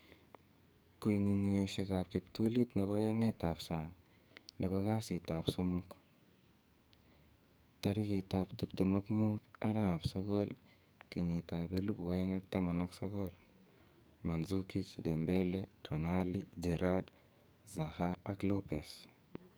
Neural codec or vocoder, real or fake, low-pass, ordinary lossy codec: codec, 44.1 kHz, 2.6 kbps, SNAC; fake; none; none